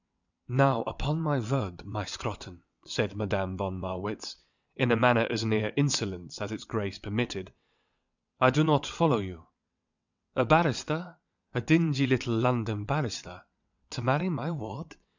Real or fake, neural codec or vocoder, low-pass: fake; vocoder, 22.05 kHz, 80 mel bands, WaveNeXt; 7.2 kHz